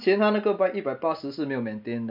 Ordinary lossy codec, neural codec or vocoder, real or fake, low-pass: none; none; real; 5.4 kHz